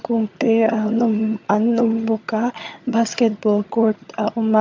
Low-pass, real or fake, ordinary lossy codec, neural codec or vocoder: 7.2 kHz; fake; MP3, 64 kbps; vocoder, 22.05 kHz, 80 mel bands, HiFi-GAN